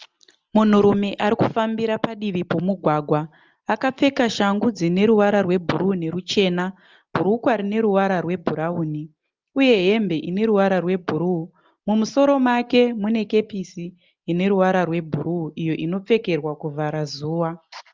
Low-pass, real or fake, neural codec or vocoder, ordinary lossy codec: 7.2 kHz; real; none; Opus, 24 kbps